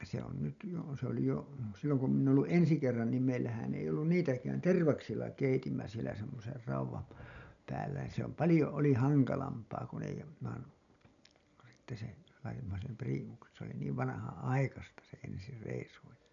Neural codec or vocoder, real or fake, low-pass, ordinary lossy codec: none; real; 7.2 kHz; none